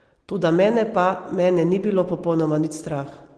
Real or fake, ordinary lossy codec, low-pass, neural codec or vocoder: real; Opus, 16 kbps; 9.9 kHz; none